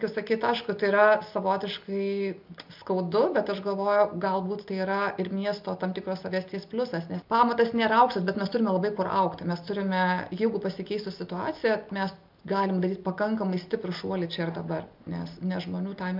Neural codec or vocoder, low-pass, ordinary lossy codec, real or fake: none; 5.4 kHz; MP3, 48 kbps; real